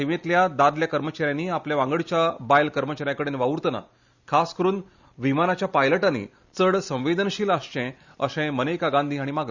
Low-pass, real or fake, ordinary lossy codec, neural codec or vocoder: 7.2 kHz; real; Opus, 64 kbps; none